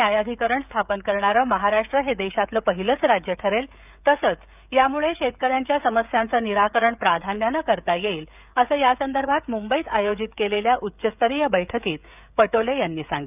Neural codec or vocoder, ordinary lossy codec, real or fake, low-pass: codec, 16 kHz, 16 kbps, FreqCodec, smaller model; none; fake; 3.6 kHz